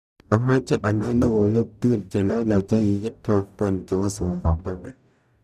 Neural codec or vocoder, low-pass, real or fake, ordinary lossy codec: codec, 44.1 kHz, 0.9 kbps, DAC; 14.4 kHz; fake; none